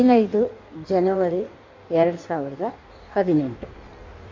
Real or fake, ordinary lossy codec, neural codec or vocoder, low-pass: fake; MP3, 48 kbps; codec, 16 kHz in and 24 kHz out, 1.1 kbps, FireRedTTS-2 codec; 7.2 kHz